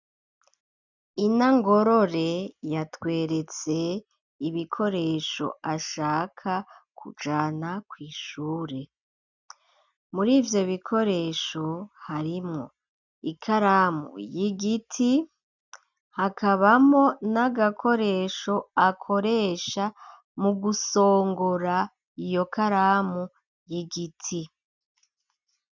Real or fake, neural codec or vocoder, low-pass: real; none; 7.2 kHz